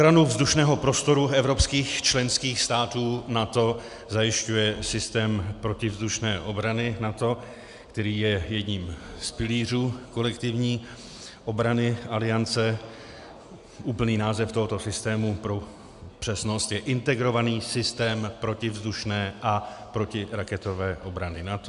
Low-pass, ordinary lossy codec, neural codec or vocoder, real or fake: 10.8 kHz; MP3, 96 kbps; none; real